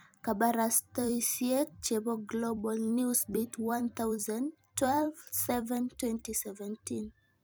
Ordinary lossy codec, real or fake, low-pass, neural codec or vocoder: none; fake; none; vocoder, 44.1 kHz, 128 mel bands every 256 samples, BigVGAN v2